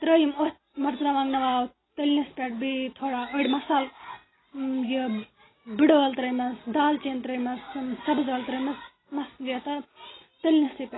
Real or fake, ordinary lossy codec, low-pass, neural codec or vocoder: real; AAC, 16 kbps; 7.2 kHz; none